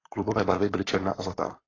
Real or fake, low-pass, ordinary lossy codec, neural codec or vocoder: fake; 7.2 kHz; AAC, 32 kbps; codec, 44.1 kHz, 7.8 kbps, Pupu-Codec